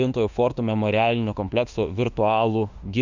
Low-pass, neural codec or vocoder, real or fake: 7.2 kHz; autoencoder, 48 kHz, 32 numbers a frame, DAC-VAE, trained on Japanese speech; fake